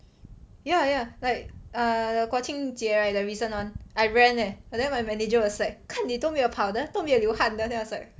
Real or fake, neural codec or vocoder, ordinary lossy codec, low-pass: real; none; none; none